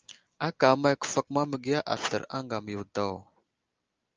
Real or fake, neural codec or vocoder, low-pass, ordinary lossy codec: real; none; 7.2 kHz; Opus, 24 kbps